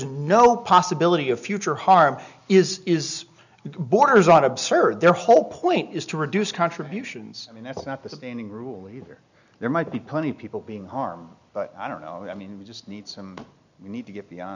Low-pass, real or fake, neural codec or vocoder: 7.2 kHz; real; none